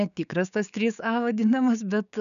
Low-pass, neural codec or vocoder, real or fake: 7.2 kHz; codec, 16 kHz, 6 kbps, DAC; fake